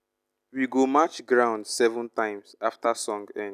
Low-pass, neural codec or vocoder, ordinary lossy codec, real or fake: 14.4 kHz; none; none; real